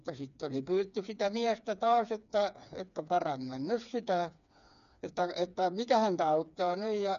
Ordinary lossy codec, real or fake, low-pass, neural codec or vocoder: none; fake; 7.2 kHz; codec, 16 kHz, 4 kbps, FreqCodec, smaller model